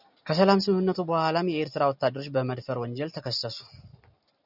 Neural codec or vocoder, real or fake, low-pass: none; real; 5.4 kHz